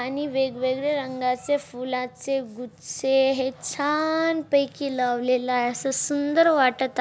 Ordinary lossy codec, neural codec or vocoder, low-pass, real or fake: none; none; none; real